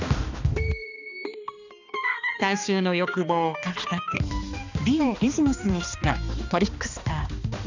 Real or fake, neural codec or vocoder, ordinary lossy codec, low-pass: fake; codec, 16 kHz, 2 kbps, X-Codec, HuBERT features, trained on balanced general audio; none; 7.2 kHz